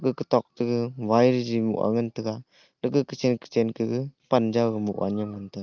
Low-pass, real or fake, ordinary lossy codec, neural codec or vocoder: 7.2 kHz; real; Opus, 32 kbps; none